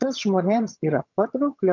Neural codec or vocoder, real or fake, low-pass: vocoder, 22.05 kHz, 80 mel bands, HiFi-GAN; fake; 7.2 kHz